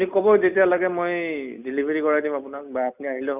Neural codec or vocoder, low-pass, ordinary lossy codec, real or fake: none; 3.6 kHz; none; real